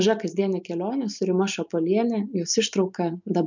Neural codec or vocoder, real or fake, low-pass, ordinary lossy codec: none; real; 7.2 kHz; MP3, 64 kbps